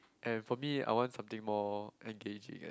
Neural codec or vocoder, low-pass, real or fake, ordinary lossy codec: none; none; real; none